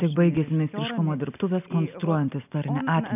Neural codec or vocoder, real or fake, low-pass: none; real; 3.6 kHz